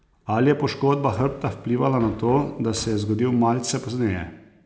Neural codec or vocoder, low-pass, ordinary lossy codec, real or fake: none; none; none; real